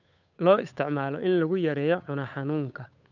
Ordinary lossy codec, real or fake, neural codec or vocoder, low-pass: none; fake; codec, 16 kHz, 8 kbps, FunCodec, trained on Chinese and English, 25 frames a second; 7.2 kHz